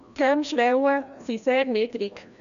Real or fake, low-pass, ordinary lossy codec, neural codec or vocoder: fake; 7.2 kHz; none; codec, 16 kHz, 1 kbps, FreqCodec, larger model